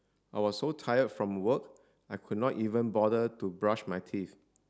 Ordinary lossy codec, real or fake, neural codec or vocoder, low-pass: none; real; none; none